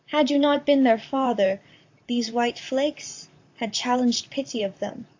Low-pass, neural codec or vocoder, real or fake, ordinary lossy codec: 7.2 kHz; vocoder, 44.1 kHz, 128 mel bands, Pupu-Vocoder; fake; AAC, 48 kbps